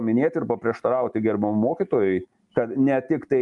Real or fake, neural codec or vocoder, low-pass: real; none; 10.8 kHz